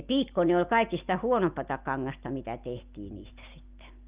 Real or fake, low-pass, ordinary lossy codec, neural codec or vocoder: real; 3.6 kHz; Opus, 24 kbps; none